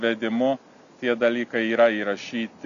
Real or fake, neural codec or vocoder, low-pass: real; none; 7.2 kHz